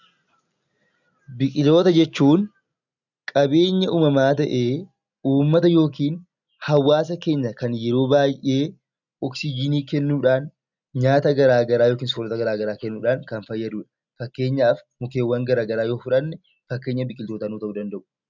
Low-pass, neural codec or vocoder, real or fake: 7.2 kHz; none; real